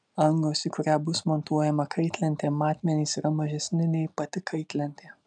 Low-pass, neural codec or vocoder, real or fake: 9.9 kHz; none; real